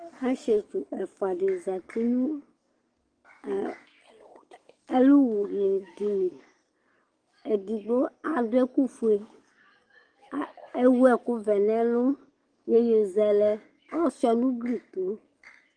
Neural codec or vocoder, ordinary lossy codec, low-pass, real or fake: codec, 44.1 kHz, 7.8 kbps, DAC; Opus, 24 kbps; 9.9 kHz; fake